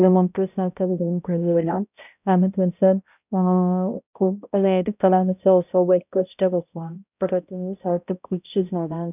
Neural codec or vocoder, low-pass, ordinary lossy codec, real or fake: codec, 16 kHz, 0.5 kbps, X-Codec, HuBERT features, trained on balanced general audio; 3.6 kHz; none; fake